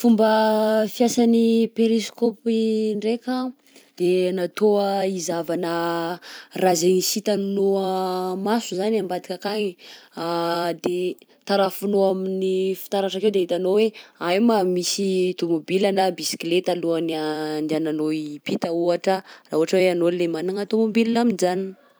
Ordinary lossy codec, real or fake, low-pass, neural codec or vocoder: none; fake; none; vocoder, 44.1 kHz, 128 mel bands every 256 samples, BigVGAN v2